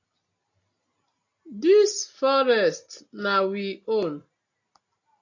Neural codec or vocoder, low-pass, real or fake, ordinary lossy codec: none; 7.2 kHz; real; AAC, 48 kbps